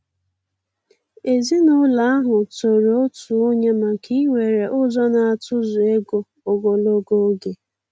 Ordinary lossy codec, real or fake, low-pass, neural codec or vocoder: none; real; none; none